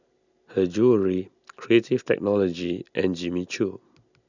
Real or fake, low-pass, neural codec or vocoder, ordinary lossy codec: real; 7.2 kHz; none; none